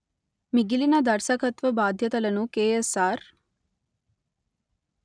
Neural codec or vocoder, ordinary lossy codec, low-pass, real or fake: none; none; 9.9 kHz; real